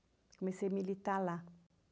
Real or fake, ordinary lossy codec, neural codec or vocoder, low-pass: real; none; none; none